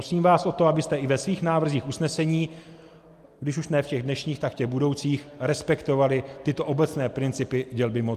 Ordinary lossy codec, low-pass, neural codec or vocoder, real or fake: Opus, 32 kbps; 14.4 kHz; none; real